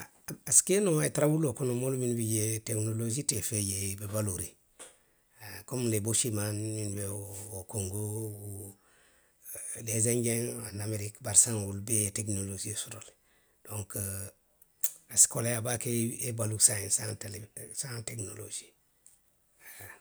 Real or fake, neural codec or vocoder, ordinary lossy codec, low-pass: real; none; none; none